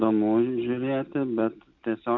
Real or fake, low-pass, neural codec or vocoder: real; 7.2 kHz; none